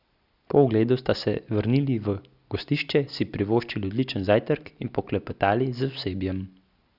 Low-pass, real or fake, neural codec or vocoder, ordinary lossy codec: 5.4 kHz; real; none; Opus, 64 kbps